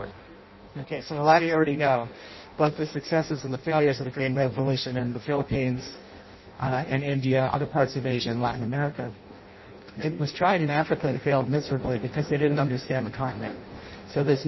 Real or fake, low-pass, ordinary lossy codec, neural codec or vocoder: fake; 7.2 kHz; MP3, 24 kbps; codec, 16 kHz in and 24 kHz out, 0.6 kbps, FireRedTTS-2 codec